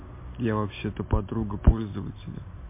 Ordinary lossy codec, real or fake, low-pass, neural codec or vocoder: MP3, 24 kbps; real; 3.6 kHz; none